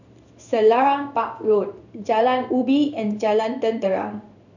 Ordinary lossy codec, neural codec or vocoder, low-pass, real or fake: none; codec, 16 kHz in and 24 kHz out, 1 kbps, XY-Tokenizer; 7.2 kHz; fake